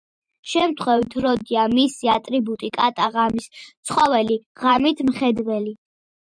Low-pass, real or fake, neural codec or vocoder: 9.9 kHz; fake; vocoder, 44.1 kHz, 128 mel bands every 512 samples, BigVGAN v2